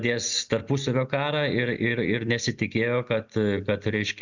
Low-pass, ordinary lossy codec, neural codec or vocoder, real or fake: 7.2 kHz; Opus, 64 kbps; none; real